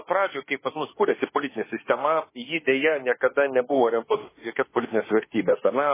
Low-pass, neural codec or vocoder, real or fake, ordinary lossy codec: 3.6 kHz; autoencoder, 48 kHz, 32 numbers a frame, DAC-VAE, trained on Japanese speech; fake; MP3, 16 kbps